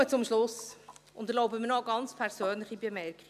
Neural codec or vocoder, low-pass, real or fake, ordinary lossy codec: none; 14.4 kHz; real; none